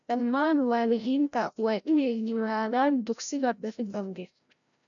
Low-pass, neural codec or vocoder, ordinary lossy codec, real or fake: 7.2 kHz; codec, 16 kHz, 0.5 kbps, FreqCodec, larger model; none; fake